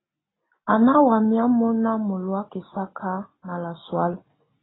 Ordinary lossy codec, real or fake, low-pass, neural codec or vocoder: AAC, 16 kbps; real; 7.2 kHz; none